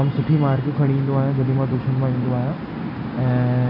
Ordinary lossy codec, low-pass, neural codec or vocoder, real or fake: AAC, 48 kbps; 5.4 kHz; none; real